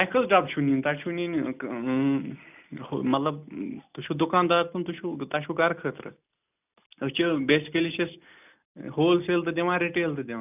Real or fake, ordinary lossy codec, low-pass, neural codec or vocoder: real; none; 3.6 kHz; none